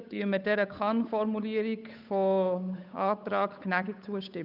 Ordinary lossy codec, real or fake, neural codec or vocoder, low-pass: none; fake; codec, 16 kHz, 8 kbps, FunCodec, trained on Chinese and English, 25 frames a second; 5.4 kHz